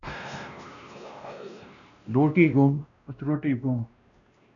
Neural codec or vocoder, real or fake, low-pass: codec, 16 kHz, 1 kbps, X-Codec, WavLM features, trained on Multilingual LibriSpeech; fake; 7.2 kHz